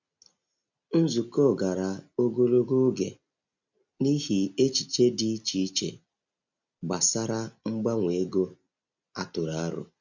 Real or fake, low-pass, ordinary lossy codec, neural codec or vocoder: real; 7.2 kHz; none; none